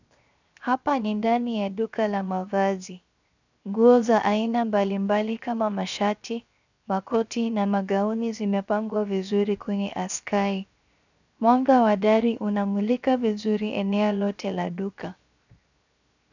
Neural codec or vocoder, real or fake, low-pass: codec, 16 kHz, 0.7 kbps, FocalCodec; fake; 7.2 kHz